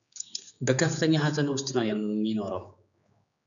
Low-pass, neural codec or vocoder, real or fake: 7.2 kHz; codec, 16 kHz, 4 kbps, X-Codec, HuBERT features, trained on general audio; fake